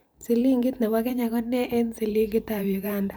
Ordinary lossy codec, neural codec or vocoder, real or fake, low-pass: none; vocoder, 44.1 kHz, 128 mel bands every 512 samples, BigVGAN v2; fake; none